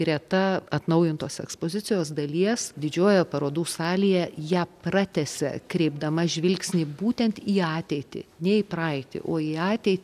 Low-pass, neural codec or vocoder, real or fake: 14.4 kHz; none; real